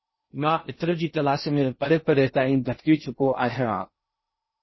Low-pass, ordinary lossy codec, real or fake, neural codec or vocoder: 7.2 kHz; MP3, 24 kbps; fake; codec, 16 kHz in and 24 kHz out, 0.6 kbps, FocalCodec, streaming, 2048 codes